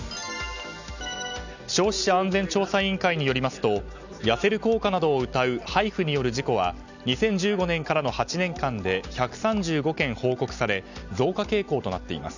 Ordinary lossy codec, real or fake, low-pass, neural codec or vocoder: none; real; 7.2 kHz; none